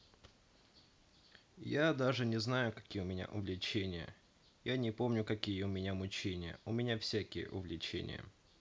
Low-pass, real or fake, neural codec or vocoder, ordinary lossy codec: none; real; none; none